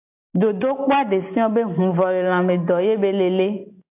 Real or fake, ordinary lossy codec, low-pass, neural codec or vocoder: real; AAC, 32 kbps; 3.6 kHz; none